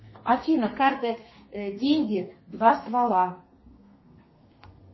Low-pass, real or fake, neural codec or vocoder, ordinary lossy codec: 7.2 kHz; fake; codec, 32 kHz, 1.9 kbps, SNAC; MP3, 24 kbps